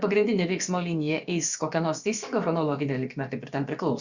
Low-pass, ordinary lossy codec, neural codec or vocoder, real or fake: 7.2 kHz; Opus, 64 kbps; codec, 16 kHz, about 1 kbps, DyCAST, with the encoder's durations; fake